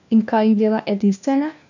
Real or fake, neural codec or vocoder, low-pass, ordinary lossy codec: fake; codec, 16 kHz, 1 kbps, FunCodec, trained on LibriTTS, 50 frames a second; 7.2 kHz; none